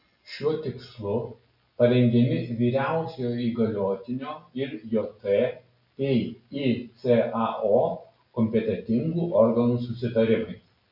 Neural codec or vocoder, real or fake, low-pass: none; real; 5.4 kHz